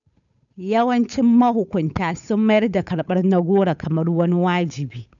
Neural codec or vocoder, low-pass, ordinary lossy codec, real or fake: codec, 16 kHz, 8 kbps, FunCodec, trained on Chinese and English, 25 frames a second; 7.2 kHz; none; fake